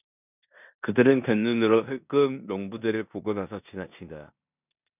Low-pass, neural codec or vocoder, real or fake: 3.6 kHz; codec, 16 kHz in and 24 kHz out, 0.9 kbps, LongCat-Audio-Codec, four codebook decoder; fake